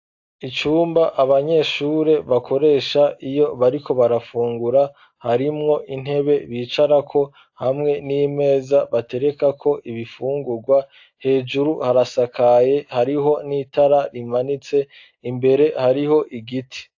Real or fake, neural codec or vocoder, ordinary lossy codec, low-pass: real; none; AAC, 48 kbps; 7.2 kHz